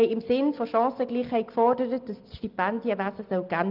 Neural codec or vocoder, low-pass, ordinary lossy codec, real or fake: none; 5.4 kHz; Opus, 32 kbps; real